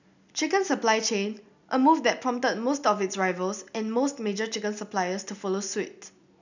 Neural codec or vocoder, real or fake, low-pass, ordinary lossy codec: none; real; 7.2 kHz; none